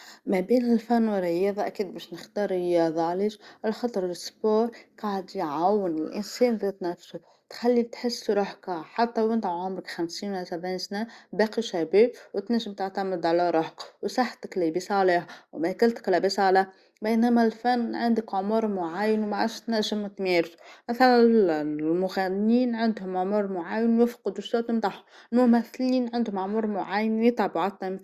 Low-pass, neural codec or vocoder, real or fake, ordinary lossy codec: 14.4 kHz; none; real; Opus, 64 kbps